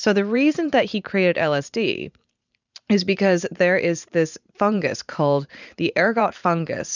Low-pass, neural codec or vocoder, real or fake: 7.2 kHz; vocoder, 44.1 kHz, 128 mel bands every 512 samples, BigVGAN v2; fake